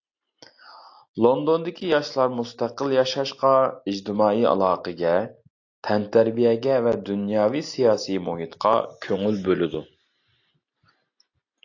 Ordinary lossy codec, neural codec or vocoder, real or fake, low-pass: AAC, 48 kbps; none; real; 7.2 kHz